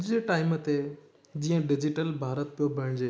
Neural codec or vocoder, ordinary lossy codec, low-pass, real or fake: none; none; none; real